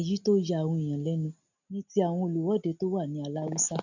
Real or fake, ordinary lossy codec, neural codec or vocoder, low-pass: real; none; none; 7.2 kHz